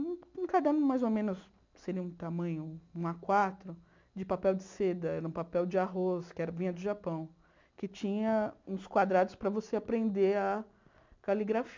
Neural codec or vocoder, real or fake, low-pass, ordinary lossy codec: none; real; 7.2 kHz; MP3, 64 kbps